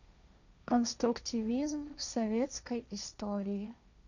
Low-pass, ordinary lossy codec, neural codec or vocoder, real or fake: 7.2 kHz; none; codec, 16 kHz, 1.1 kbps, Voila-Tokenizer; fake